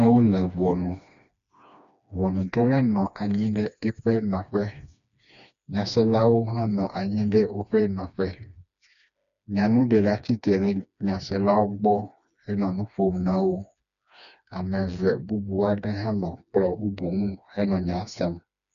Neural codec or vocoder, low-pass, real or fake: codec, 16 kHz, 2 kbps, FreqCodec, smaller model; 7.2 kHz; fake